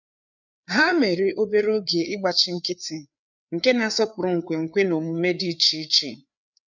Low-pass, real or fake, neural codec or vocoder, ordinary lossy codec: 7.2 kHz; fake; codec, 16 kHz, 4 kbps, FreqCodec, larger model; none